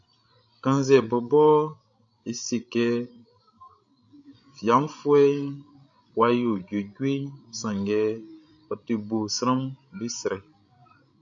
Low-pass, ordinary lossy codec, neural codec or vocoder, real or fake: 7.2 kHz; AAC, 64 kbps; codec, 16 kHz, 16 kbps, FreqCodec, larger model; fake